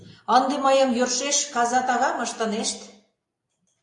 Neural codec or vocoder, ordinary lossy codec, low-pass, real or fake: none; AAC, 64 kbps; 10.8 kHz; real